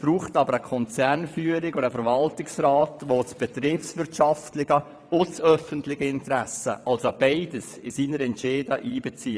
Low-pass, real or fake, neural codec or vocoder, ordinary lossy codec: none; fake; vocoder, 22.05 kHz, 80 mel bands, WaveNeXt; none